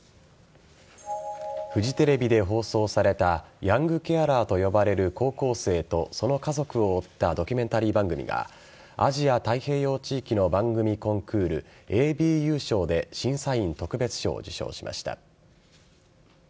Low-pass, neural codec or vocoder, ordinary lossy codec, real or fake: none; none; none; real